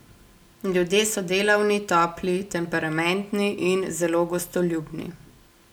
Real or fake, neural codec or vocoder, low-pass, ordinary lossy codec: real; none; none; none